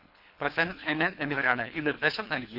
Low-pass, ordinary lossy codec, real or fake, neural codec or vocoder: 5.4 kHz; none; fake; codec, 24 kHz, 3 kbps, HILCodec